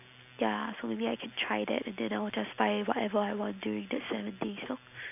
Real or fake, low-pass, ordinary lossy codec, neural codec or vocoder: real; 3.6 kHz; none; none